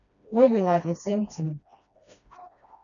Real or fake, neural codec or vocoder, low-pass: fake; codec, 16 kHz, 1 kbps, FreqCodec, smaller model; 7.2 kHz